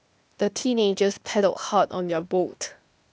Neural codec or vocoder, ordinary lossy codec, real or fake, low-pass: codec, 16 kHz, 0.8 kbps, ZipCodec; none; fake; none